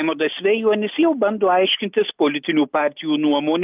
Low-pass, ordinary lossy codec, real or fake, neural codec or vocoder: 3.6 kHz; Opus, 24 kbps; real; none